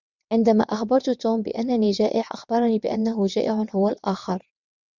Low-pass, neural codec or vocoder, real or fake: 7.2 kHz; vocoder, 22.05 kHz, 80 mel bands, WaveNeXt; fake